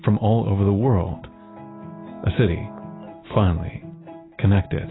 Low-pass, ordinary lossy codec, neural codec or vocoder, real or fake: 7.2 kHz; AAC, 16 kbps; none; real